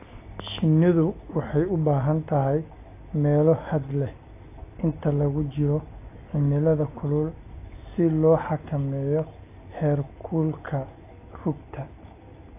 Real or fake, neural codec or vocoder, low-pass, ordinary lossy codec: real; none; 3.6 kHz; AAC, 24 kbps